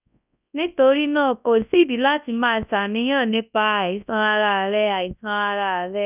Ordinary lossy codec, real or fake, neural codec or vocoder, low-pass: none; fake; codec, 24 kHz, 0.9 kbps, WavTokenizer, large speech release; 3.6 kHz